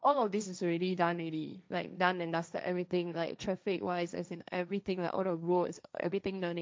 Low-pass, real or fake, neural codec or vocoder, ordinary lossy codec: none; fake; codec, 16 kHz, 1.1 kbps, Voila-Tokenizer; none